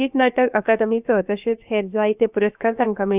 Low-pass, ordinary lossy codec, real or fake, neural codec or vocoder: 3.6 kHz; none; fake; codec, 16 kHz, 0.7 kbps, FocalCodec